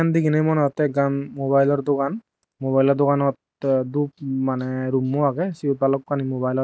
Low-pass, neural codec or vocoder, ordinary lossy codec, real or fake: none; none; none; real